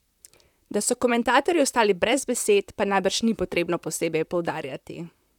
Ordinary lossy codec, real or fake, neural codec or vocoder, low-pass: none; fake; vocoder, 44.1 kHz, 128 mel bands, Pupu-Vocoder; 19.8 kHz